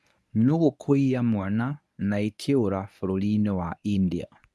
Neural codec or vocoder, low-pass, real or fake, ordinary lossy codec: codec, 24 kHz, 0.9 kbps, WavTokenizer, medium speech release version 1; none; fake; none